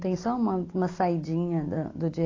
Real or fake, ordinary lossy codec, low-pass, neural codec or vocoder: real; AAC, 32 kbps; 7.2 kHz; none